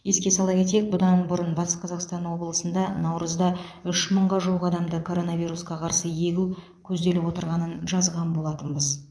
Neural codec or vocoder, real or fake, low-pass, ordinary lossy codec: vocoder, 22.05 kHz, 80 mel bands, Vocos; fake; none; none